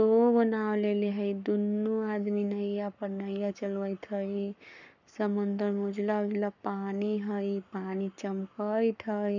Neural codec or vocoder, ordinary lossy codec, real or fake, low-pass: codec, 44.1 kHz, 7.8 kbps, Pupu-Codec; none; fake; 7.2 kHz